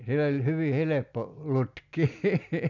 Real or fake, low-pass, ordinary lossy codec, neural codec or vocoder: real; 7.2 kHz; none; none